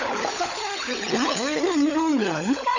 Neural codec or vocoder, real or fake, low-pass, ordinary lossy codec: codec, 16 kHz, 16 kbps, FunCodec, trained on LibriTTS, 50 frames a second; fake; 7.2 kHz; none